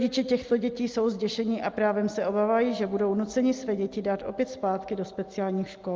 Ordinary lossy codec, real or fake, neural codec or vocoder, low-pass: Opus, 24 kbps; real; none; 7.2 kHz